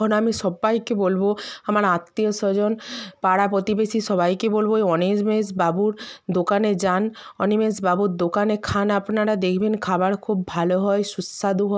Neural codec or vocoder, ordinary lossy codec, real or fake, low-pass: none; none; real; none